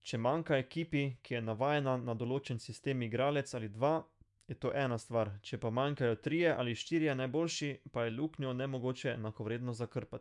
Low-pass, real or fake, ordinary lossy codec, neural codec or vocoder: 10.8 kHz; fake; MP3, 96 kbps; autoencoder, 48 kHz, 128 numbers a frame, DAC-VAE, trained on Japanese speech